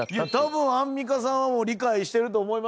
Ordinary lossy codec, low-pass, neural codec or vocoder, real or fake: none; none; none; real